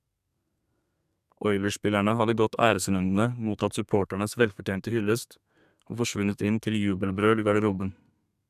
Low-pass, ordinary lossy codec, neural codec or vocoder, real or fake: 14.4 kHz; none; codec, 32 kHz, 1.9 kbps, SNAC; fake